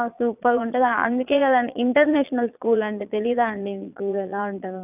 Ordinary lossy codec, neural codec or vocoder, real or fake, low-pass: none; vocoder, 22.05 kHz, 80 mel bands, Vocos; fake; 3.6 kHz